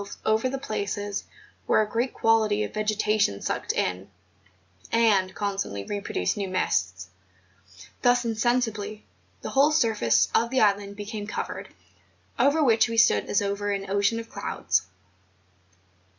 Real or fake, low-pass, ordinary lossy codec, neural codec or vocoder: real; 7.2 kHz; Opus, 64 kbps; none